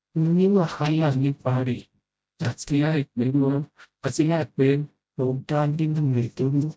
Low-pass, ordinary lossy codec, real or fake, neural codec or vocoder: none; none; fake; codec, 16 kHz, 0.5 kbps, FreqCodec, smaller model